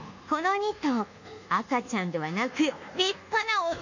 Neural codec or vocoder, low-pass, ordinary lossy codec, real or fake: codec, 24 kHz, 1.2 kbps, DualCodec; 7.2 kHz; none; fake